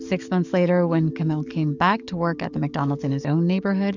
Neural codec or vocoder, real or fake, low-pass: codec, 44.1 kHz, 7.8 kbps, DAC; fake; 7.2 kHz